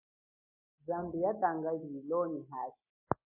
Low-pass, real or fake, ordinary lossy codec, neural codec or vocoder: 3.6 kHz; real; MP3, 24 kbps; none